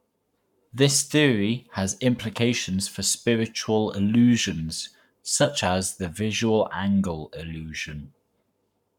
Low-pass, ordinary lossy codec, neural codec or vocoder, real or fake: 19.8 kHz; none; codec, 44.1 kHz, 7.8 kbps, Pupu-Codec; fake